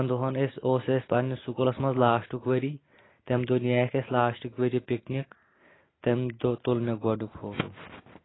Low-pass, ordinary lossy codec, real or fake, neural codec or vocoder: 7.2 kHz; AAC, 16 kbps; real; none